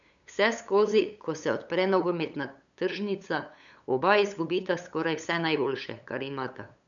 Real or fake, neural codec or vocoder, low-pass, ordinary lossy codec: fake; codec, 16 kHz, 8 kbps, FunCodec, trained on LibriTTS, 25 frames a second; 7.2 kHz; none